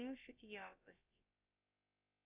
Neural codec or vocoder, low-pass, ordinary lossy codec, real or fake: codec, 16 kHz, about 1 kbps, DyCAST, with the encoder's durations; 3.6 kHz; Opus, 64 kbps; fake